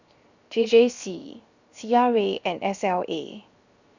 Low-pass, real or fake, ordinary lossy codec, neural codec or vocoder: 7.2 kHz; fake; Opus, 64 kbps; codec, 16 kHz, 0.7 kbps, FocalCodec